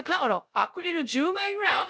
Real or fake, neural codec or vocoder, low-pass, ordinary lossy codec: fake; codec, 16 kHz, 0.3 kbps, FocalCodec; none; none